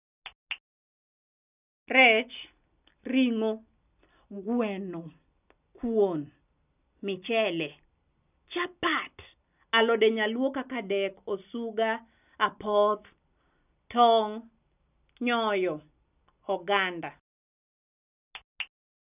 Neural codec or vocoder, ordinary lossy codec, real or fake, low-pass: none; none; real; 3.6 kHz